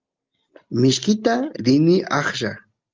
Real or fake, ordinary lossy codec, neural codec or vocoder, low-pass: real; Opus, 32 kbps; none; 7.2 kHz